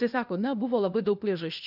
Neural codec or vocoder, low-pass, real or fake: codec, 16 kHz, 0.8 kbps, ZipCodec; 5.4 kHz; fake